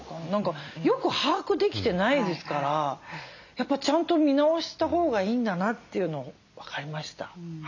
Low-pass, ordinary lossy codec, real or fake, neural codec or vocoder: 7.2 kHz; none; real; none